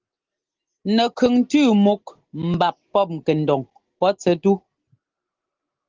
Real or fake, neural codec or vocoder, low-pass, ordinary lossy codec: real; none; 7.2 kHz; Opus, 16 kbps